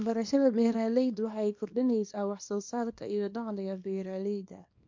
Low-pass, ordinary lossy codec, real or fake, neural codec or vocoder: 7.2 kHz; MP3, 48 kbps; fake; codec, 24 kHz, 0.9 kbps, WavTokenizer, small release